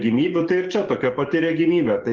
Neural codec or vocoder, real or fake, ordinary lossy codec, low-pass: codec, 44.1 kHz, 7.8 kbps, DAC; fake; Opus, 16 kbps; 7.2 kHz